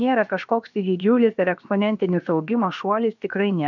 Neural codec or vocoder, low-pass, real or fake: codec, 16 kHz, about 1 kbps, DyCAST, with the encoder's durations; 7.2 kHz; fake